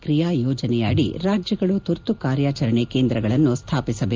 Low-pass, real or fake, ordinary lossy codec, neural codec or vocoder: 7.2 kHz; real; Opus, 32 kbps; none